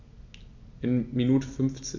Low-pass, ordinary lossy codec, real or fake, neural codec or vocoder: 7.2 kHz; MP3, 64 kbps; real; none